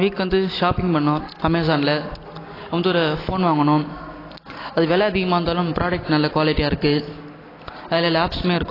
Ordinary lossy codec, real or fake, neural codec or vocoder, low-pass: AAC, 24 kbps; real; none; 5.4 kHz